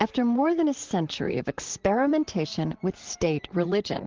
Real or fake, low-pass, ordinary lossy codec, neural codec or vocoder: fake; 7.2 kHz; Opus, 16 kbps; vocoder, 44.1 kHz, 128 mel bands, Pupu-Vocoder